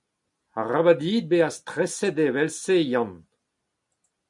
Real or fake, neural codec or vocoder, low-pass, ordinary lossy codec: real; none; 10.8 kHz; MP3, 96 kbps